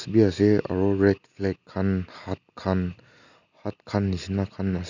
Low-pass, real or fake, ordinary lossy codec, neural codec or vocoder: 7.2 kHz; real; none; none